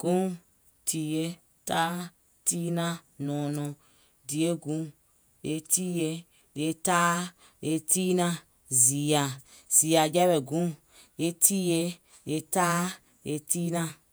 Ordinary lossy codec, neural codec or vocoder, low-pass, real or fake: none; vocoder, 48 kHz, 128 mel bands, Vocos; none; fake